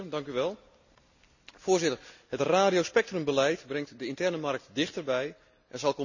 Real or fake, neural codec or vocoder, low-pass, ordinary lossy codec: real; none; 7.2 kHz; none